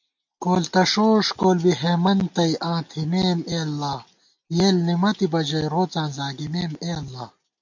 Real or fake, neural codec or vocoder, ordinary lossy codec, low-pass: real; none; MP3, 48 kbps; 7.2 kHz